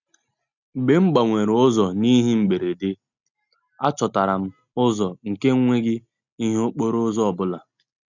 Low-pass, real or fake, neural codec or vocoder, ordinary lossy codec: 7.2 kHz; real; none; none